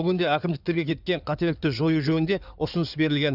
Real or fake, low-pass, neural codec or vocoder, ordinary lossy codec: fake; 5.4 kHz; codec, 16 kHz in and 24 kHz out, 2.2 kbps, FireRedTTS-2 codec; none